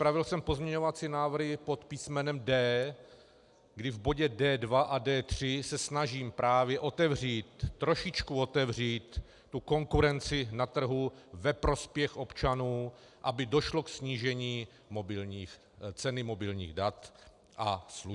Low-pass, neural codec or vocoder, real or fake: 10.8 kHz; none; real